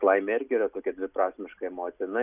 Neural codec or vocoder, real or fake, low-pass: none; real; 3.6 kHz